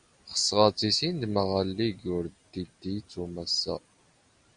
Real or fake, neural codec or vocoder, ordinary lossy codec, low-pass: real; none; Opus, 64 kbps; 9.9 kHz